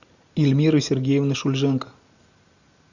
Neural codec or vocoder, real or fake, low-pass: none; real; 7.2 kHz